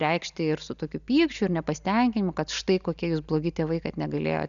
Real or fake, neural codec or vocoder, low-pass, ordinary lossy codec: real; none; 7.2 kHz; MP3, 96 kbps